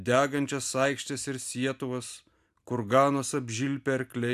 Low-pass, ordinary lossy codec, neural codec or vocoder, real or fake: 14.4 kHz; AAC, 96 kbps; none; real